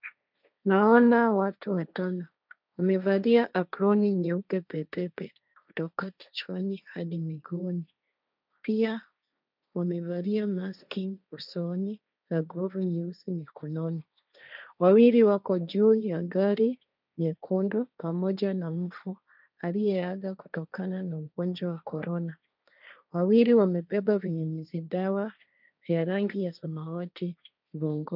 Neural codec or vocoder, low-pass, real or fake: codec, 16 kHz, 1.1 kbps, Voila-Tokenizer; 5.4 kHz; fake